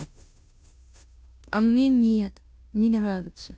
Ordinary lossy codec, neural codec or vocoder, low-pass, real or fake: none; codec, 16 kHz, 0.5 kbps, FunCodec, trained on Chinese and English, 25 frames a second; none; fake